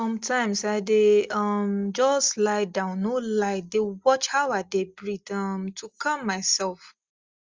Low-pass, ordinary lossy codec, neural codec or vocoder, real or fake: 7.2 kHz; Opus, 32 kbps; none; real